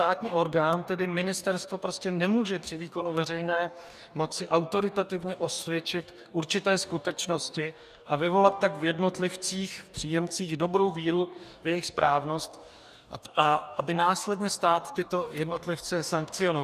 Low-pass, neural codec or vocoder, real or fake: 14.4 kHz; codec, 44.1 kHz, 2.6 kbps, DAC; fake